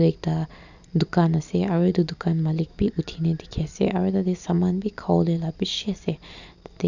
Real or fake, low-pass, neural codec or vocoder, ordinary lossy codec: real; 7.2 kHz; none; none